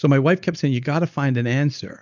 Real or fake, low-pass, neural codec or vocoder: real; 7.2 kHz; none